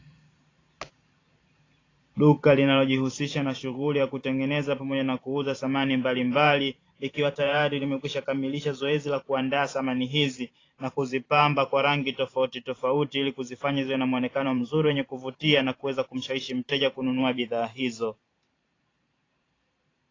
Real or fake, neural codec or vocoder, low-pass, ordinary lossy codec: fake; vocoder, 44.1 kHz, 128 mel bands every 512 samples, BigVGAN v2; 7.2 kHz; AAC, 32 kbps